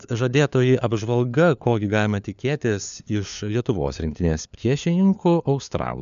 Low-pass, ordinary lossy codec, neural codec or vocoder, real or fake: 7.2 kHz; MP3, 96 kbps; codec, 16 kHz, 4 kbps, FunCodec, trained on LibriTTS, 50 frames a second; fake